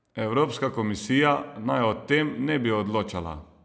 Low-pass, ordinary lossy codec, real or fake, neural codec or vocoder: none; none; real; none